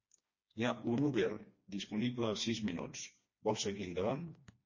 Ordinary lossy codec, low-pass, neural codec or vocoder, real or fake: MP3, 32 kbps; 7.2 kHz; codec, 16 kHz, 2 kbps, FreqCodec, smaller model; fake